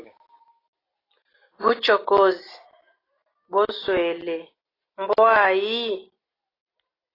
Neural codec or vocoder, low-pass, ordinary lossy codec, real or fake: none; 5.4 kHz; AAC, 24 kbps; real